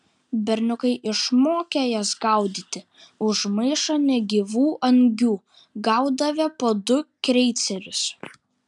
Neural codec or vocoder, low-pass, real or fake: none; 10.8 kHz; real